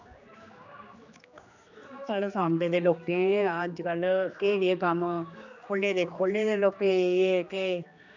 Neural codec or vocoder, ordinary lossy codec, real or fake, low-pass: codec, 16 kHz, 2 kbps, X-Codec, HuBERT features, trained on general audio; none; fake; 7.2 kHz